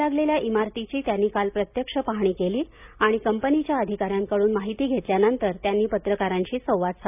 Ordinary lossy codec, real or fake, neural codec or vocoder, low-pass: none; real; none; 3.6 kHz